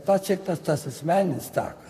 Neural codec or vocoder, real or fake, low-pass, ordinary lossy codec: vocoder, 44.1 kHz, 128 mel bands, Pupu-Vocoder; fake; 14.4 kHz; MP3, 96 kbps